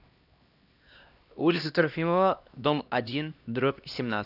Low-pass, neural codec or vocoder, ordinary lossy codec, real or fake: 5.4 kHz; codec, 16 kHz, 1 kbps, X-Codec, HuBERT features, trained on LibriSpeech; none; fake